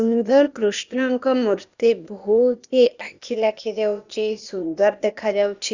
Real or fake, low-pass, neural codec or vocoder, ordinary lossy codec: fake; 7.2 kHz; codec, 16 kHz, 0.8 kbps, ZipCodec; Opus, 64 kbps